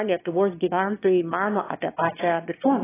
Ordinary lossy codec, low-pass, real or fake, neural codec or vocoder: AAC, 16 kbps; 3.6 kHz; fake; autoencoder, 22.05 kHz, a latent of 192 numbers a frame, VITS, trained on one speaker